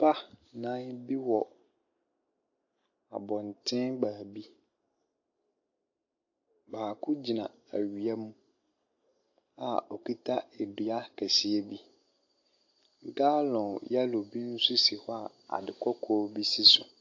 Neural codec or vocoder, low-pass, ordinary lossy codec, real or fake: none; 7.2 kHz; AAC, 48 kbps; real